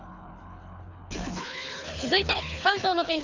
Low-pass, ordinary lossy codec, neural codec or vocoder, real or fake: 7.2 kHz; AAC, 48 kbps; codec, 24 kHz, 3 kbps, HILCodec; fake